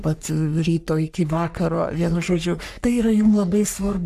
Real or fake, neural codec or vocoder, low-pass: fake; codec, 44.1 kHz, 3.4 kbps, Pupu-Codec; 14.4 kHz